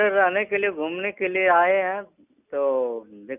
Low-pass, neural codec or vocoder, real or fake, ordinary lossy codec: 3.6 kHz; none; real; none